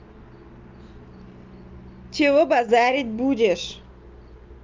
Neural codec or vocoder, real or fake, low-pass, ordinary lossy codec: none; real; 7.2 kHz; Opus, 24 kbps